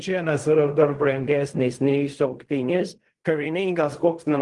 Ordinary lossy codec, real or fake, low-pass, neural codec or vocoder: Opus, 24 kbps; fake; 10.8 kHz; codec, 16 kHz in and 24 kHz out, 0.4 kbps, LongCat-Audio-Codec, fine tuned four codebook decoder